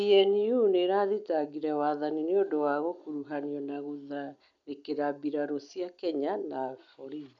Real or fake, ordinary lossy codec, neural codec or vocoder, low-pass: real; none; none; 7.2 kHz